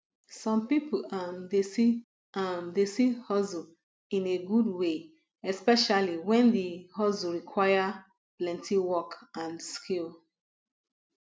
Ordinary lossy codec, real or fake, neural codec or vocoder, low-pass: none; real; none; none